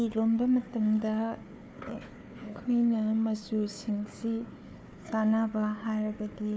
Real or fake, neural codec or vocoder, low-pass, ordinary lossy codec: fake; codec, 16 kHz, 4 kbps, FunCodec, trained on Chinese and English, 50 frames a second; none; none